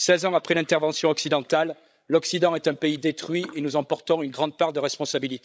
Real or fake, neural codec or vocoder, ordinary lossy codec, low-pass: fake; codec, 16 kHz, 16 kbps, FreqCodec, larger model; none; none